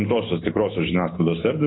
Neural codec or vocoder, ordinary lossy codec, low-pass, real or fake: none; AAC, 16 kbps; 7.2 kHz; real